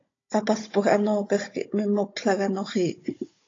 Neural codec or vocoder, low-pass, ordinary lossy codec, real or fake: codec, 16 kHz, 16 kbps, FunCodec, trained on Chinese and English, 50 frames a second; 7.2 kHz; AAC, 32 kbps; fake